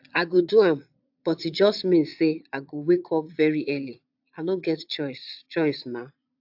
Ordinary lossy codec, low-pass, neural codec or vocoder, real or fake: AAC, 48 kbps; 5.4 kHz; vocoder, 22.05 kHz, 80 mel bands, Vocos; fake